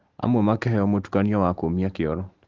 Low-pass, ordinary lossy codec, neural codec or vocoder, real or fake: 7.2 kHz; Opus, 16 kbps; none; real